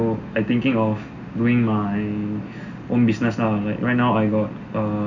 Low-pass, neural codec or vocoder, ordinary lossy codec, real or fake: 7.2 kHz; none; none; real